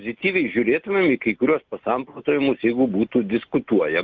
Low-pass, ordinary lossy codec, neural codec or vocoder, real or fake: 7.2 kHz; Opus, 32 kbps; none; real